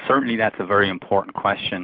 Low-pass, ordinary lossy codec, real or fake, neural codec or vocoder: 5.4 kHz; Opus, 64 kbps; real; none